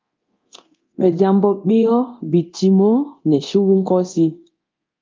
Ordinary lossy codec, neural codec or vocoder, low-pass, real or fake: Opus, 24 kbps; codec, 24 kHz, 0.9 kbps, DualCodec; 7.2 kHz; fake